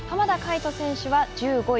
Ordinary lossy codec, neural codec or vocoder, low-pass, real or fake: none; none; none; real